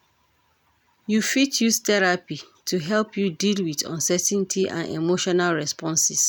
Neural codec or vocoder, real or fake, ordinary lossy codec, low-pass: none; real; none; none